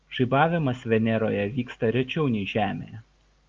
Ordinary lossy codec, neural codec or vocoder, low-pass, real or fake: Opus, 24 kbps; none; 7.2 kHz; real